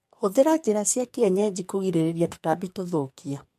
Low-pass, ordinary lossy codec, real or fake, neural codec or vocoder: 14.4 kHz; MP3, 64 kbps; fake; codec, 44.1 kHz, 2.6 kbps, SNAC